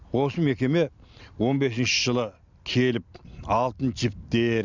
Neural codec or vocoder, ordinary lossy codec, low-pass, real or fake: none; none; 7.2 kHz; real